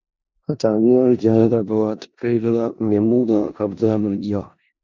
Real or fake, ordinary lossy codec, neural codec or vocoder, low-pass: fake; Opus, 64 kbps; codec, 16 kHz in and 24 kHz out, 0.4 kbps, LongCat-Audio-Codec, four codebook decoder; 7.2 kHz